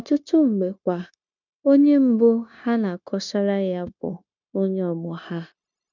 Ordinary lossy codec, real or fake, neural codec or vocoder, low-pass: none; fake; codec, 16 kHz, 0.9 kbps, LongCat-Audio-Codec; 7.2 kHz